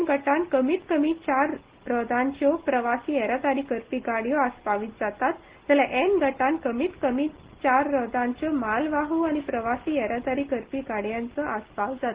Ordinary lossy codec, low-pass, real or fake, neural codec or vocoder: Opus, 16 kbps; 3.6 kHz; real; none